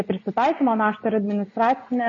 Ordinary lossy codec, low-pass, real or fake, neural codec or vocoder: MP3, 32 kbps; 7.2 kHz; real; none